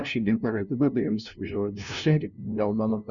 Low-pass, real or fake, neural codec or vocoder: 7.2 kHz; fake; codec, 16 kHz, 0.5 kbps, FunCodec, trained on LibriTTS, 25 frames a second